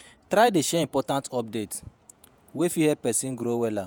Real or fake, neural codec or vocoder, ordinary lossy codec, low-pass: fake; vocoder, 48 kHz, 128 mel bands, Vocos; none; none